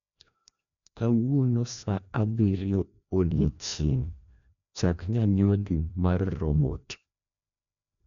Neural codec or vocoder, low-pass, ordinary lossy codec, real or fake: codec, 16 kHz, 1 kbps, FreqCodec, larger model; 7.2 kHz; none; fake